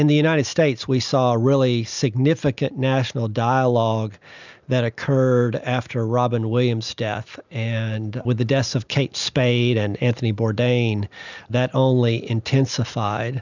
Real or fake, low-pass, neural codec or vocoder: real; 7.2 kHz; none